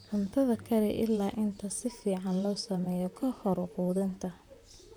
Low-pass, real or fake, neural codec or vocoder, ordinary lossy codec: none; fake; vocoder, 44.1 kHz, 128 mel bands, Pupu-Vocoder; none